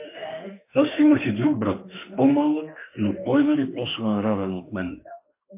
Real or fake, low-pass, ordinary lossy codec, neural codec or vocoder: fake; 3.6 kHz; MP3, 24 kbps; autoencoder, 48 kHz, 32 numbers a frame, DAC-VAE, trained on Japanese speech